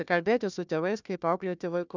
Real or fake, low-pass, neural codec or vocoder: fake; 7.2 kHz; codec, 16 kHz, 1 kbps, FunCodec, trained on Chinese and English, 50 frames a second